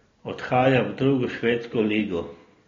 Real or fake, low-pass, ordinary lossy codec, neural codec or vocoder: real; 7.2 kHz; AAC, 32 kbps; none